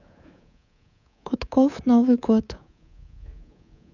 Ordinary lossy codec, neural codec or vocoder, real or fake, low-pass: none; codec, 24 kHz, 3.1 kbps, DualCodec; fake; 7.2 kHz